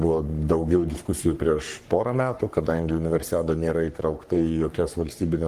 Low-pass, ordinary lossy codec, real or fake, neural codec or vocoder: 14.4 kHz; Opus, 24 kbps; fake; codec, 44.1 kHz, 3.4 kbps, Pupu-Codec